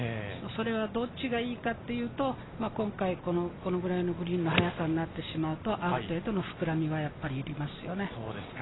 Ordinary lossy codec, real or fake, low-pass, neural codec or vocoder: AAC, 16 kbps; real; 7.2 kHz; none